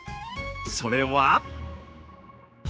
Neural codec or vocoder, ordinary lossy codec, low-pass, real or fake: codec, 16 kHz, 4 kbps, X-Codec, HuBERT features, trained on general audio; none; none; fake